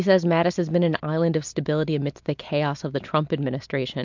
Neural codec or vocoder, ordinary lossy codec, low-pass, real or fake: none; MP3, 64 kbps; 7.2 kHz; real